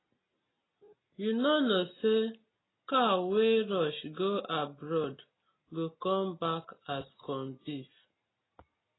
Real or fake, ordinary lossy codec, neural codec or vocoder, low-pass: real; AAC, 16 kbps; none; 7.2 kHz